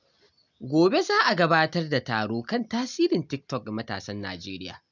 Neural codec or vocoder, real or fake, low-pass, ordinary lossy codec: none; real; 7.2 kHz; none